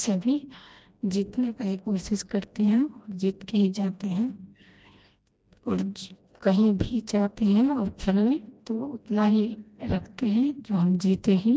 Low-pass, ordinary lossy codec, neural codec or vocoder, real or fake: none; none; codec, 16 kHz, 1 kbps, FreqCodec, smaller model; fake